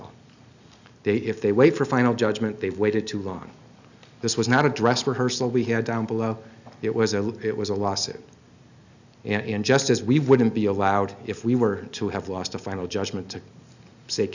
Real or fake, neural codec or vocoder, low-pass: real; none; 7.2 kHz